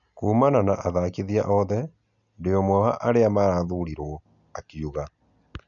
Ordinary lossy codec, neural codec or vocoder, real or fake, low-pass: none; none; real; 7.2 kHz